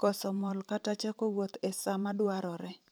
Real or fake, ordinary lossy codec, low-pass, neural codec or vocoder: fake; none; none; vocoder, 44.1 kHz, 128 mel bands every 512 samples, BigVGAN v2